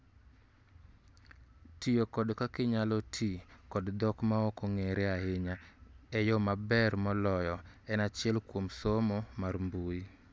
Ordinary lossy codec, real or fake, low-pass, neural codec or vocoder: none; real; none; none